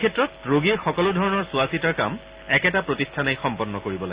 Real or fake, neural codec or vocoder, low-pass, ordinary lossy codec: real; none; 3.6 kHz; Opus, 64 kbps